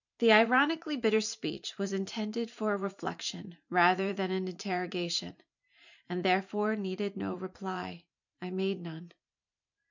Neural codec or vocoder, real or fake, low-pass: vocoder, 44.1 kHz, 128 mel bands every 512 samples, BigVGAN v2; fake; 7.2 kHz